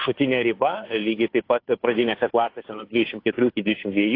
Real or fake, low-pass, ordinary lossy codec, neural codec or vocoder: fake; 5.4 kHz; AAC, 32 kbps; codec, 16 kHz, 2 kbps, FunCodec, trained on Chinese and English, 25 frames a second